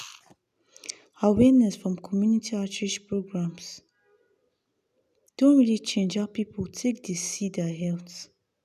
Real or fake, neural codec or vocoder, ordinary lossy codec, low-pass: real; none; none; 14.4 kHz